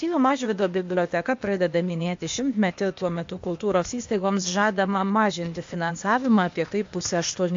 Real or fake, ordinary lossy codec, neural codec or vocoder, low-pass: fake; MP3, 48 kbps; codec, 16 kHz, 0.8 kbps, ZipCodec; 7.2 kHz